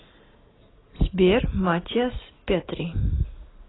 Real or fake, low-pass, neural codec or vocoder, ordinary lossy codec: real; 7.2 kHz; none; AAC, 16 kbps